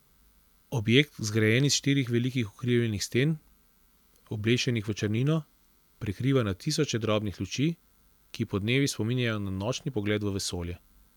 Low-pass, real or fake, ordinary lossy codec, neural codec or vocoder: 19.8 kHz; real; none; none